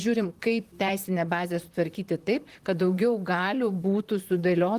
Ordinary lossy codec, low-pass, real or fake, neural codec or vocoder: Opus, 24 kbps; 14.4 kHz; fake; vocoder, 44.1 kHz, 128 mel bands, Pupu-Vocoder